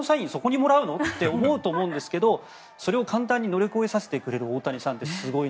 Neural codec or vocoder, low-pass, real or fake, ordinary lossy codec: none; none; real; none